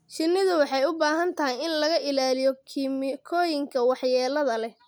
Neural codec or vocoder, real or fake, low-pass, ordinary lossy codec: none; real; none; none